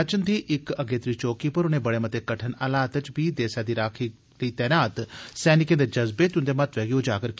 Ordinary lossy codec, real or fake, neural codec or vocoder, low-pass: none; real; none; none